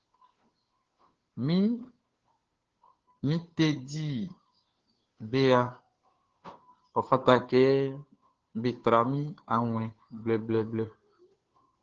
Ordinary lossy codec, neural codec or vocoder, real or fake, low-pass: Opus, 16 kbps; codec, 16 kHz, 2 kbps, FunCodec, trained on Chinese and English, 25 frames a second; fake; 7.2 kHz